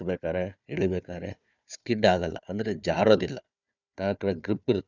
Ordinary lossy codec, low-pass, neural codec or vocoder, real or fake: none; 7.2 kHz; codec, 16 kHz, 4 kbps, FunCodec, trained on Chinese and English, 50 frames a second; fake